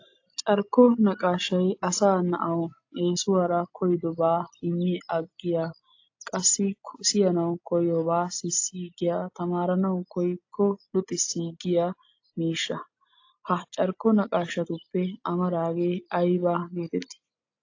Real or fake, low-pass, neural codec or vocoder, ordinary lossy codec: real; 7.2 kHz; none; AAC, 48 kbps